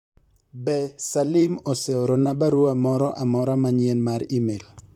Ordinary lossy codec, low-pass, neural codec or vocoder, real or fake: none; 19.8 kHz; vocoder, 44.1 kHz, 128 mel bands, Pupu-Vocoder; fake